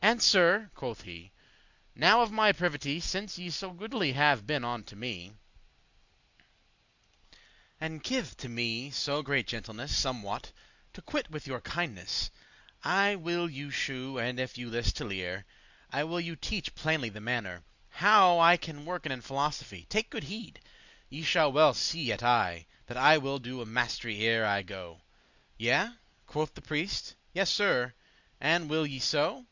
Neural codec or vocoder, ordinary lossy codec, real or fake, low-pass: none; Opus, 64 kbps; real; 7.2 kHz